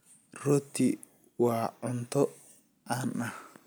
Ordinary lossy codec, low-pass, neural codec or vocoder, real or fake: none; none; none; real